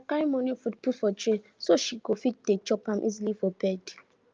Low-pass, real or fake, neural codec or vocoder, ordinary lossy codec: 7.2 kHz; real; none; Opus, 24 kbps